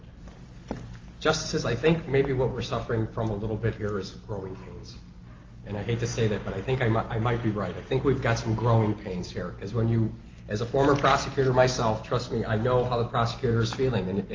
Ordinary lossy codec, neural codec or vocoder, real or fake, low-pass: Opus, 32 kbps; none; real; 7.2 kHz